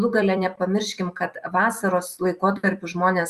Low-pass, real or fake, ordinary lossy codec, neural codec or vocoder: 14.4 kHz; fake; Opus, 64 kbps; vocoder, 44.1 kHz, 128 mel bands every 256 samples, BigVGAN v2